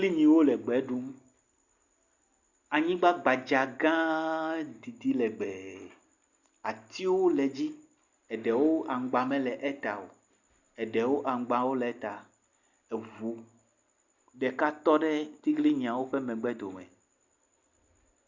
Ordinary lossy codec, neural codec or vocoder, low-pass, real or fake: Opus, 64 kbps; none; 7.2 kHz; real